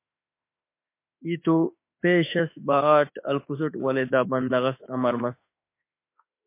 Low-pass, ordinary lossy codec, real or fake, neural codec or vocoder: 3.6 kHz; MP3, 24 kbps; fake; autoencoder, 48 kHz, 32 numbers a frame, DAC-VAE, trained on Japanese speech